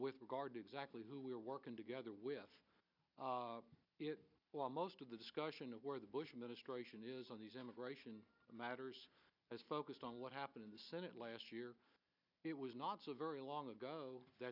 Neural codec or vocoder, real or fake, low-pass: none; real; 5.4 kHz